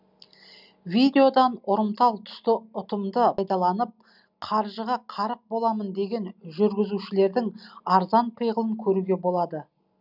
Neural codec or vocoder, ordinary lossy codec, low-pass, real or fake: none; none; 5.4 kHz; real